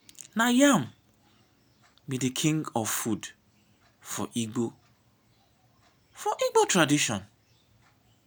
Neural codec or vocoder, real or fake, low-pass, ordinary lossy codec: none; real; none; none